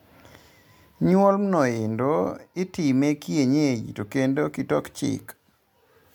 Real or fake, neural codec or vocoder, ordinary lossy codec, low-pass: real; none; MP3, 96 kbps; 19.8 kHz